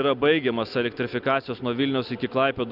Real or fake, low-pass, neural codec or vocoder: real; 5.4 kHz; none